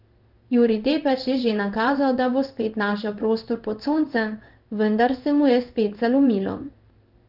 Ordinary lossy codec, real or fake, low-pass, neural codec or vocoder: Opus, 32 kbps; fake; 5.4 kHz; codec, 16 kHz in and 24 kHz out, 1 kbps, XY-Tokenizer